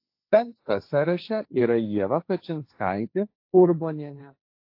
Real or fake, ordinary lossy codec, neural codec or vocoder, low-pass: fake; AAC, 32 kbps; codec, 16 kHz, 1.1 kbps, Voila-Tokenizer; 5.4 kHz